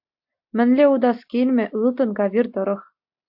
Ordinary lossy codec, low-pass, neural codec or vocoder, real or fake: AAC, 48 kbps; 5.4 kHz; none; real